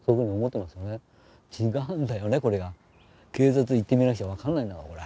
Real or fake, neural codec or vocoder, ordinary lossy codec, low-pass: real; none; none; none